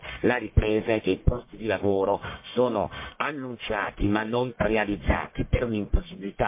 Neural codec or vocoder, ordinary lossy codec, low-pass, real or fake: codec, 44.1 kHz, 1.7 kbps, Pupu-Codec; MP3, 24 kbps; 3.6 kHz; fake